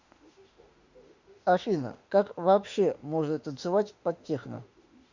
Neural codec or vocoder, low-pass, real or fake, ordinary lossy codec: autoencoder, 48 kHz, 32 numbers a frame, DAC-VAE, trained on Japanese speech; 7.2 kHz; fake; Opus, 64 kbps